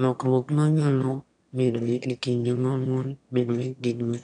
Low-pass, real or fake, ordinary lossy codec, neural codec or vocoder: 9.9 kHz; fake; none; autoencoder, 22.05 kHz, a latent of 192 numbers a frame, VITS, trained on one speaker